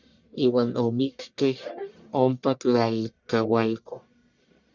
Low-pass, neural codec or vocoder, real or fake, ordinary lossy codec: 7.2 kHz; codec, 44.1 kHz, 1.7 kbps, Pupu-Codec; fake; Opus, 64 kbps